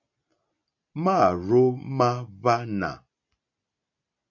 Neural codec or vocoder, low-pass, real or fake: none; 7.2 kHz; real